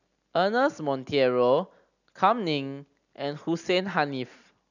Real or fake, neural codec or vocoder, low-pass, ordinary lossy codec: real; none; 7.2 kHz; none